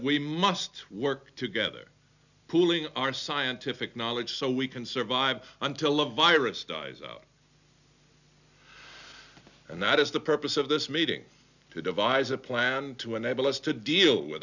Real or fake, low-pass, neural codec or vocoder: real; 7.2 kHz; none